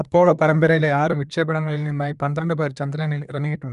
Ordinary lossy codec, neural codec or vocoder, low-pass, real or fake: none; codec, 24 kHz, 1 kbps, SNAC; 10.8 kHz; fake